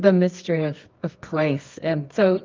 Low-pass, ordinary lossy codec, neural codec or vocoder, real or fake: 7.2 kHz; Opus, 24 kbps; codec, 24 kHz, 0.9 kbps, WavTokenizer, medium music audio release; fake